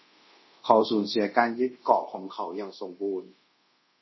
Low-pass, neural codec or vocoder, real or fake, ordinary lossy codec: 7.2 kHz; codec, 24 kHz, 0.5 kbps, DualCodec; fake; MP3, 24 kbps